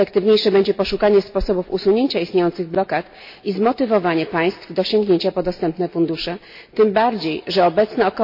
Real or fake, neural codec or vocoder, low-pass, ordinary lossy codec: real; none; 5.4 kHz; MP3, 32 kbps